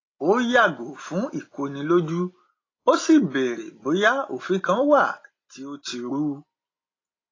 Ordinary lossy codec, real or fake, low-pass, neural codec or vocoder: AAC, 32 kbps; real; 7.2 kHz; none